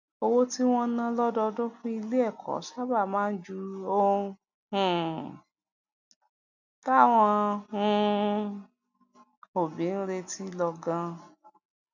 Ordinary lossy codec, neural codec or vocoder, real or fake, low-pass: none; none; real; 7.2 kHz